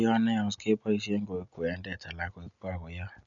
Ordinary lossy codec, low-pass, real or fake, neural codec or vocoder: none; 7.2 kHz; real; none